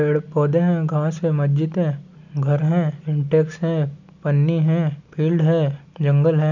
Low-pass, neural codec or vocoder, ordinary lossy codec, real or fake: 7.2 kHz; none; none; real